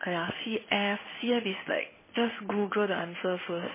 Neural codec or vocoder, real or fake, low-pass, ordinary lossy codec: codec, 16 kHz in and 24 kHz out, 1 kbps, XY-Tokenizer; fake; 3.6 kHz; MP3, 16 kbps